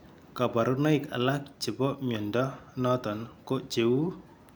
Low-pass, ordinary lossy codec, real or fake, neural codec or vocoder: none; none; real; none